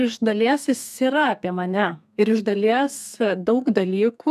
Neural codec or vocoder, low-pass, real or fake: codec, 32 kHz, 1.9 kbps, SNAC; 14.4 kHz; fake